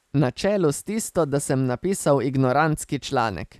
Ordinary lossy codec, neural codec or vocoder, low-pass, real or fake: none; none; 14.4 kHz; real